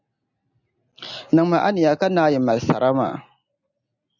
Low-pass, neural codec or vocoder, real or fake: 7.2 kHz; none; real